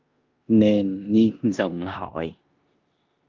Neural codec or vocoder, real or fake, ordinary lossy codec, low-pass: codec, 16 kHz in and 24 kHz out, 0.9 kbps, LongCat-Audio-Codec, four codebook decoder; fake; Opus, 24 kbps; 7.2 kHz